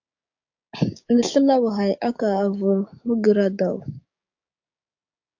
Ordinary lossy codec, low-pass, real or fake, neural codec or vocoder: AAC, 48 kbps; 7.2 kHz; fake; codec, 16 kHz, 6 kbps, DAC